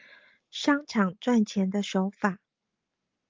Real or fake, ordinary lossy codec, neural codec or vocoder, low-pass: real; Opus, 32 kbps; none; 7.2 kHz